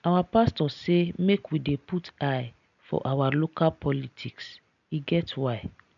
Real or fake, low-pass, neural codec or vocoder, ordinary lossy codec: real; 7.2 kHz; none; none